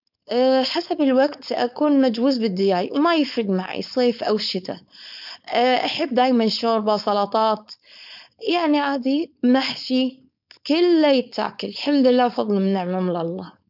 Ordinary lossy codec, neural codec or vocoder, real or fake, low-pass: none; codec, 16 kHz, 4.8 kbps, FACodec; fake; 5.4 kHz